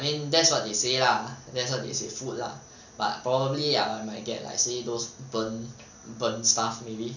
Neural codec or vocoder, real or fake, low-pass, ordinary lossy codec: none; real; 7.2 kHz; none